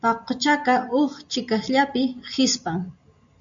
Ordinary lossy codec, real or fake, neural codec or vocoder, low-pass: MP3, 64 kbps; real; none; 7.2 kHz